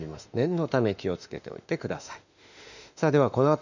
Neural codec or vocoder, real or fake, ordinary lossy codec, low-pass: autoencoder, 48 kHz, 32 numbers a frame, DAC-VAE, trained on Japanese speech; fake; none; 7.2 kHz